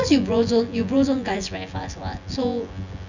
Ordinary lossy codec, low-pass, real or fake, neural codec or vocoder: none; 7.2 kHz; fake; vocoder, 24 kHz, 100 mel bands, Vocos